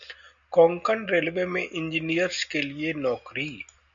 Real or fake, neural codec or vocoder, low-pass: real; none; 7.2 kHz